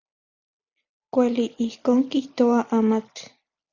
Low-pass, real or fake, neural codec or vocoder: 7.2 kHz; real; none